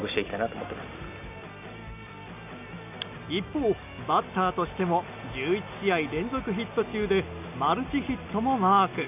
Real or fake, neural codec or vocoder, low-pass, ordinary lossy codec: real; none; 3.6 kHz; none